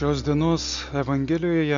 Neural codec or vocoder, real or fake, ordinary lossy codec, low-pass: none; real; MP3, 64 kbps; 7.2 kHz